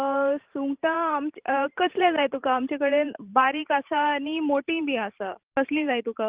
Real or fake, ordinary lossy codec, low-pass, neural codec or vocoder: fake; Opus, 32 kbps; 3.6 kHz; vocoder, 44.1 kHz, 128 mel bands every 512 samples, BigVGAN v2